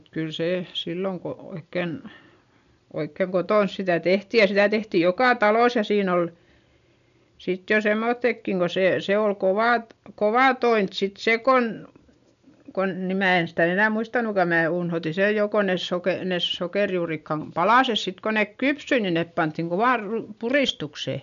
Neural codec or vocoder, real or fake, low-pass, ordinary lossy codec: none; real; 7.2 kHz; MP3, 96 kbps